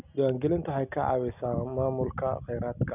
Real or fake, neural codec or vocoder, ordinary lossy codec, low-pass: real; none; none; 3.6 kHz